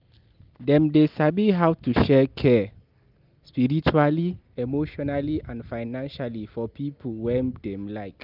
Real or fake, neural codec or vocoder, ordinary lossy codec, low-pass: real; none; Opus, 24 kbps; 5.4 kHz